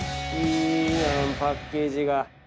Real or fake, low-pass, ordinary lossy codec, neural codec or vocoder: real; none; none; none